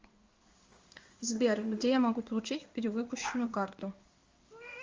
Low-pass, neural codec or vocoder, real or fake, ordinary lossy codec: 7.2 kHz; codec, 16 kHz in and 24 kHz out, 1 kbps, XY-Tokenizer; fake; Opus, 32 kbps